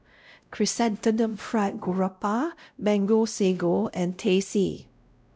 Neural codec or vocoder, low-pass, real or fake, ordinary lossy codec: codec, 16 kHz, 0.5 kbps, X-Codec, WavLM features, trained on Multilingual LibriSpeech; none; fake; none